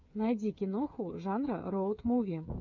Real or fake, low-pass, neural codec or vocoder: fake; 7.2 kHz; codec, 16 kHz, 8 kbps, FreqCodec, smaller model